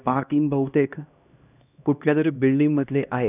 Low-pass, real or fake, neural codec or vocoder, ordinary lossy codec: 3.6 kHz; fake; codec, 16 kHz, 1 kbps, X-Codec, HuBERT features, trained on LibriSpeech; none